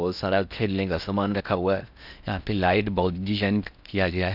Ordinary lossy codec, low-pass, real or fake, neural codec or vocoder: none; 5.4 kHz; fake; codec, 16 kHz in and 24 kHz out, 0.6 kbps, FocalCodec, streaming, 4096 codes